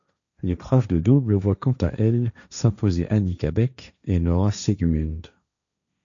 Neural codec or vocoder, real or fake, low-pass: codec, 16 kHz, 1.1 kbps, Voila-Tokenizer; fake; 7.2 kHz